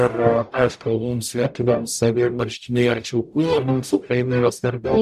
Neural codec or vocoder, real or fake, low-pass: codec, 44.1 kHz, 0.9 kbps, DAC; fake; 14.4 kHz